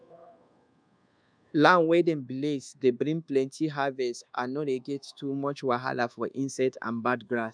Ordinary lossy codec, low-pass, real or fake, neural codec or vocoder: none; 9.9 kHz; fake; codec, 24 kHz, 1.2 kbps, DualCodec